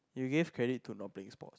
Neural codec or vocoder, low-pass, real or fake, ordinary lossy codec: none; none; real; none